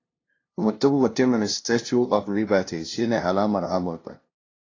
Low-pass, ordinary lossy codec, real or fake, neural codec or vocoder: 7.2 kHz; AAC, 32 kbps; fake; codec, 16 kHz, 0.5 kbps, FunCodec, trained on LibriTTS, 25 frames a second